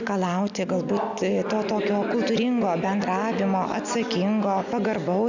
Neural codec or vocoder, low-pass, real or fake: none; 7.2 kHz; real